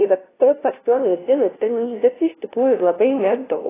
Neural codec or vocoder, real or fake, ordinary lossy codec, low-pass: codec, 16 kHz, 0.5 kbps, FunCodec, trained on LibriTTS, 25 frames a second; fake; AAC, 16 kbps; 3.6 kHz